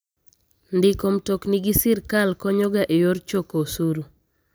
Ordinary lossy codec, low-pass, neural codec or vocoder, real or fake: none; none; none; real